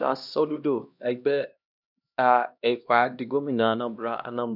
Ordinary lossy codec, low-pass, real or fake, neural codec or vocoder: none; 5.4 kHz; fake; codec, 16 kHz, 1 kbps, X-Codec, HuBERT features, trained on LibriSpeech